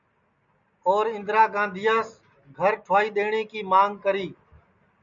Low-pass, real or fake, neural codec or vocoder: 7.2 kHz; real; none